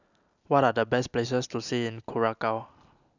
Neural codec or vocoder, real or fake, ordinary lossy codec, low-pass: none; real; none; 7.2 kHz